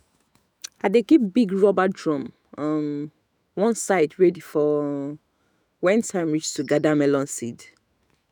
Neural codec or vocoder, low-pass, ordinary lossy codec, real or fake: autoencoder, 48 kHz, 128 numbers a frame, DAC-VAE, trained on Japanese speech; none; none; fake